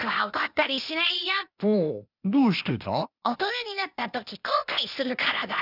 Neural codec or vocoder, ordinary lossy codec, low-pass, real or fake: codec, 16 kHz, 0.8 kbps, ZipCodec; none; 5.4 kHz; fake